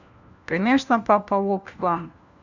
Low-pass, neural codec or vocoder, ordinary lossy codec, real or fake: 7.2 kHz; codec, 16 kHz, 1 kbps, FunCodec, trained on LibriTTS, 50 frames a second; none; fake